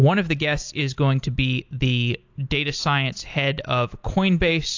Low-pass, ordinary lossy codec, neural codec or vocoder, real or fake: 7.2 kHz; AAC, 48 kbps; none; real